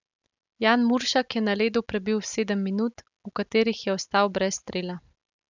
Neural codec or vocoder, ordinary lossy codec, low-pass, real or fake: none; none; 7.2 kHz; real